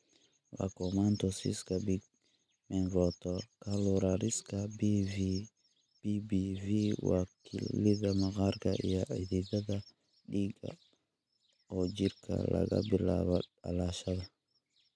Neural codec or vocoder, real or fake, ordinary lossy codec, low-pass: none; real; none; none